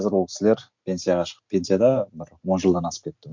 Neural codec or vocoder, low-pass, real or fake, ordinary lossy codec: none; 7.2 kHz; real; MP3, 48 kbps